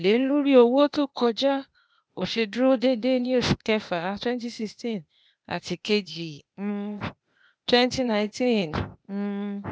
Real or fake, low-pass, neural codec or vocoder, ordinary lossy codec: fake; none; codec, 16 kHz, 0.8 kbps, ZipCodec; none